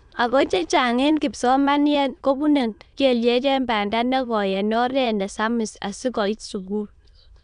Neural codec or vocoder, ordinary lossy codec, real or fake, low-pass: autoencoder, 22.05 kHz, a latent of 192 numbers a frame, VITS, trained on many speakers; none; fake; 9.9 kHz